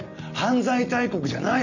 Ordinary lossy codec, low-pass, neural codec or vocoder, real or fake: none; 7.2 kHz; none; real